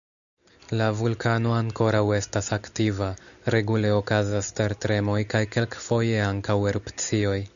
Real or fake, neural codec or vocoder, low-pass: real; none; 7.2 kHz